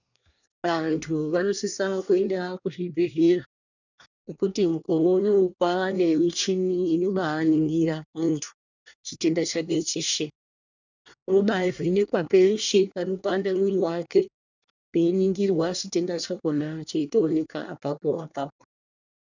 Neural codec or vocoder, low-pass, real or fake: codec, 24 kHz, 1 kbps, SNAC; 7.2 kHz; fake